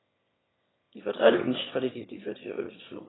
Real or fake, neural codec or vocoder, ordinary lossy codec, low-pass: fake; autoencoder, 22.05 kHz, a latent of 192 numbers a frame, VITS, trained on one speaker; AAC, 16 kbps; 7.2 kHz